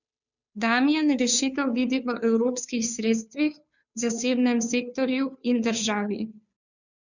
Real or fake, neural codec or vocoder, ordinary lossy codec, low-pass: fake; codec, 16 kHz, 2 kbps, FunCodec, trained on Chinese and English, 25 frames a second; none; 7.2 kHz